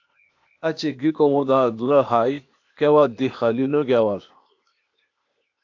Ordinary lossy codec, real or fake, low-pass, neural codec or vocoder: AAC, 48 kbps; fake; 7.2 kHz; codec, 16 kHz, 0.8 kbps, ZipCodec